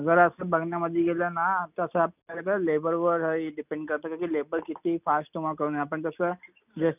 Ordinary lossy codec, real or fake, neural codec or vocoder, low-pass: none; real; none; 3.6 kHz